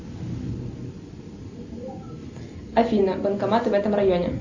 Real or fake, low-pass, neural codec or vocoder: real; 7.2 kHz; none